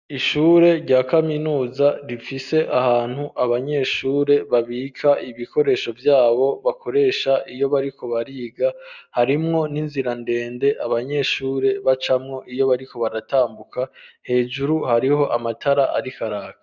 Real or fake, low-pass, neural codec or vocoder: real; 7.2 kHz; none